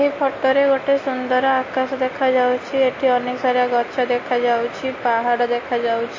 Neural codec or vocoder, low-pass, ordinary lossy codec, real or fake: none; 7.2 kHz; MP3, 32 kbps; real